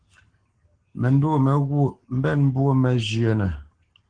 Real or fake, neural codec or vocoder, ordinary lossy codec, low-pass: fake; codec, 44.1 kHz, 7.8 kbps, Pupu-Codec; Opus, 16 kbps; 9.9 kHz